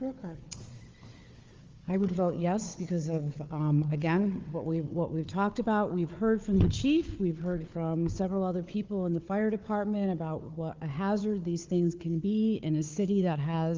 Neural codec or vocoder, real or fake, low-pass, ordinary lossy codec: codec, 16 kHz, 4 kbps, FunCodec, trained on Chinese and English, 50 frames a second; fake; 7.2 kHz; Opus, 32 kbps